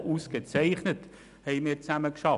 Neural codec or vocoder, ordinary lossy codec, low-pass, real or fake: none; none; 10.8 kHz; real